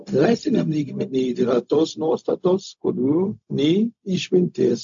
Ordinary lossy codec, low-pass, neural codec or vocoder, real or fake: AAC, 64 kbps; 7.2 kHz; codec, 16 kHz, 0.4 kbps, LongCat-Audio-Codec; fake